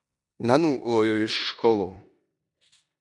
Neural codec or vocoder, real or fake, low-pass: codec, 16 kHz in and 24 kHz out, 0.9 kbps, LongCat-Audio-Codec, four codebook decoder; fake; 10.8 kHz